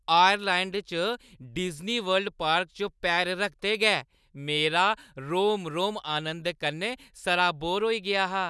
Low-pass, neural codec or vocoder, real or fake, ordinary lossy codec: none; none; real; none